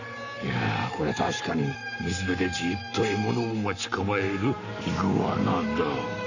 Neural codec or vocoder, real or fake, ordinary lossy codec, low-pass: codec, 44.1 kHz, 7.8 kbps, Pupu-Codec; fake; none; 7.2 kHz